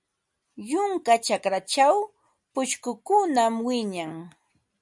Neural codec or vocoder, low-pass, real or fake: none; 10.8 kHz; real